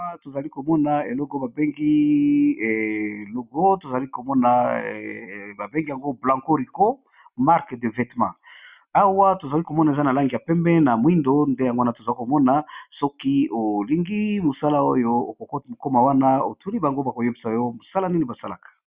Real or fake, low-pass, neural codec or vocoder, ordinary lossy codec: real; 3.6 kHz; none; Opus, 64 kbps